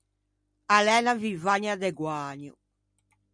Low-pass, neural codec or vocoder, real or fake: 9.9 kHz; none; real